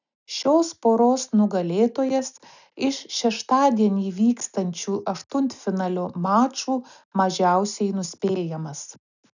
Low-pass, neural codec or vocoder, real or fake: 7.2 kHz; none; real